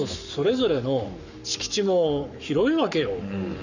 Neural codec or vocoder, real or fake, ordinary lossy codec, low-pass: codec, 44.1 kHz, 7.8 kbps, Pupu-Codec; fake; none; 7.2 kHz